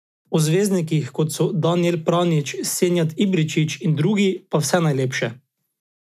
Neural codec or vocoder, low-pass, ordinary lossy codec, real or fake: none; 14.4 kHz; none; real